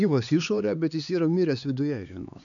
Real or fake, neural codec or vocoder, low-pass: fake; codec, 16 kHz, 4 kbps, X-Codec, HuBERT features, trained on LibriSpeech; 7.2 kHz